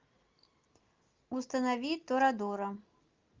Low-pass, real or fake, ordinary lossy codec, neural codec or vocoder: 7.2 kHz; real; Opus, 24 kbps; none